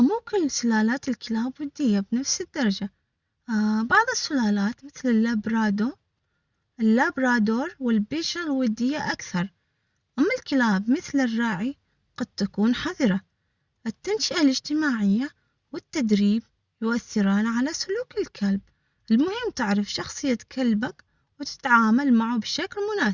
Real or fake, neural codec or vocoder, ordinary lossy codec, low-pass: real; none; Opus, 64 kbps; 7.2 kHz